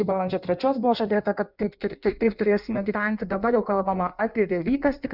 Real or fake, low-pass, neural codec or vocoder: fake; 5.4 kHz; codec, 16 kHz in and 24 kHz out, 1.1 kbps, FireRedTTS-2 codec